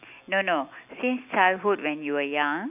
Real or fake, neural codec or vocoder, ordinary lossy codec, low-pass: real; none; none; 3.6 kHz